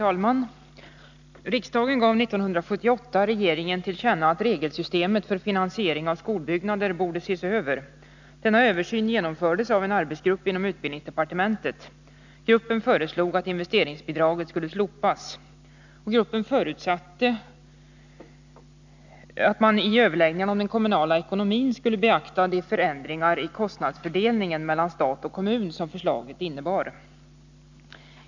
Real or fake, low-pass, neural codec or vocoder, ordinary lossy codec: real; 7.2 kHz; none; none